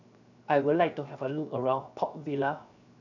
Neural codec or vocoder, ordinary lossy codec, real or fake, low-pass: codec, 16 kHz, 0.7 kbps, FocalCodec; none; fake; 7.2 kHz